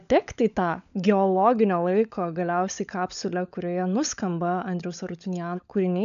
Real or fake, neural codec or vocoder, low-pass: fake; codec, 16 kHz, 16 kbps, FunCodec, trained on LibriTTS, 50 frames a second; 7.2 kHz